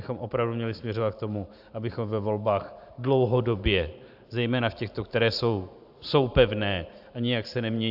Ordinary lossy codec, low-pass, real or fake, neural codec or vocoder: AAC, 48 kbps; 5.4 kHz; fake; vocoder, 44.1 kHz, 128 mel bands every 512 samples, BigVGAN v2